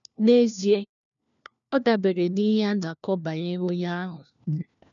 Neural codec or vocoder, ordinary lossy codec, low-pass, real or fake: codec, 16 kHz, 1 kbps, FunCodec, trained on LibriTTS, 50 frames a second; none; 7.2 kHz; fake